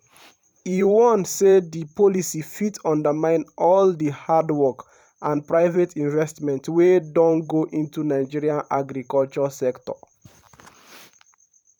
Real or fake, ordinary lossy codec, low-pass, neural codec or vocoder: fake; none; 19.8 kHz; vocoder, 44.1 kHz, 128 mel bands every 512 samples, BigVGAN v2